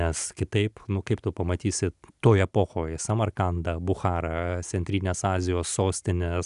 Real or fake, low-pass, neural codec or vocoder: real; 10.8 kHz; none